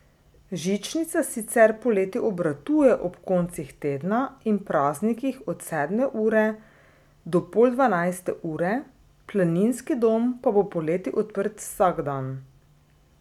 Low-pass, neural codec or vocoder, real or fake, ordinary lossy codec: 19.8 kHz; none; real; none